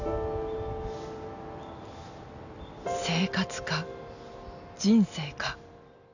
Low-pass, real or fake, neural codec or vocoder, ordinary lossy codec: 7.2 kHz; real; none; none